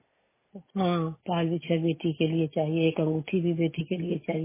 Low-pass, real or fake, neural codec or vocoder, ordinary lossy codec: 3.6 kHz; real; none; MP3, 16 kbps